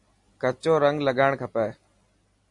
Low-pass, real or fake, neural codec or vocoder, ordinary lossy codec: 10.8 kHz; real; none; MP3, 48 kbps